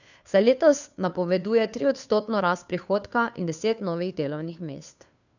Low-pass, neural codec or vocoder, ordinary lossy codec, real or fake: 7.2 kHz; codec, 16 kHz, 2 kbps, FunCodec, trained on Chinese and English, 25 frames a second; none; fake